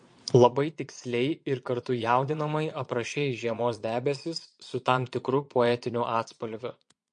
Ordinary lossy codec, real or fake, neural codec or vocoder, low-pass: MP3, 48 kbps; fake; vocoder, 22.05 kHz, 80 mel bands, Vocos; 9.9 kHz